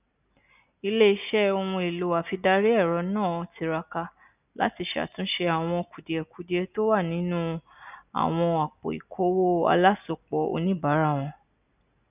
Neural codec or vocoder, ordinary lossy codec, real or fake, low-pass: none; none; real; 3.6 kHz